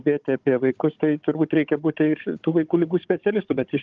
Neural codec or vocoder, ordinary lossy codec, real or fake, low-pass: codec, 16 kHz, 16 kbps, FunCodec, trained on Chinese and English, 50 frames a second; Opus, 32 kbps; fake; 7.2 kHz